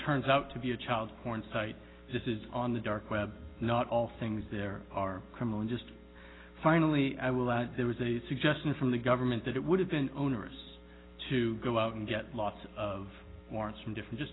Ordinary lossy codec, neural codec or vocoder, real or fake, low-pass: AAC, 16 kbps; none; real; 7.2 kHz